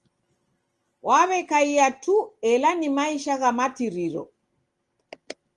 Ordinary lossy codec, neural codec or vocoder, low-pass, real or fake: Opus, 24 kbps; none; 10.8 kHz; real